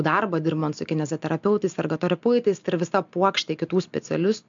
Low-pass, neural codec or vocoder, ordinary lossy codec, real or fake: 7.2 kHz; none; MP3, 64 kbps; real